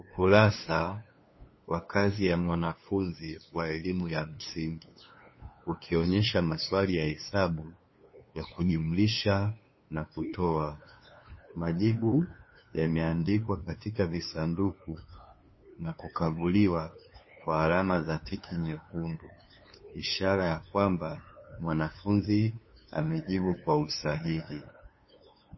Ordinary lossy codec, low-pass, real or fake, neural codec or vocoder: MP3, 24 kbps; 7.2 kHz; fake; codec, 16 kHz, 2 kbps, FunCodec, trained on LibriTTS, 25 frames a second